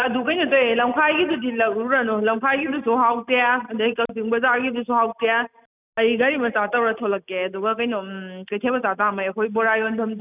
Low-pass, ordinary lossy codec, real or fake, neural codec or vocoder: 3.6 kHz; none; real; none